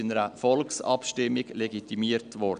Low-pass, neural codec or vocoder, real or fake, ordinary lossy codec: 9.9 kHz; none; real; none